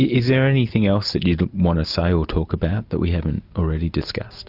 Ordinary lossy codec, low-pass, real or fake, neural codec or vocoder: Opus, 64 kbps; 5.4 kHz; real; none